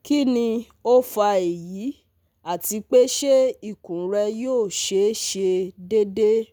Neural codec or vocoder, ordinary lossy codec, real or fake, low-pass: none; none; real; none